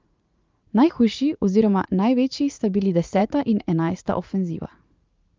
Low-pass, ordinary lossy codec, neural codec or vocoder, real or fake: 7.2 kHz; Opus, 24 kbps; vocoder, 44.1 kHz, 80 mel bands, Vocos; fake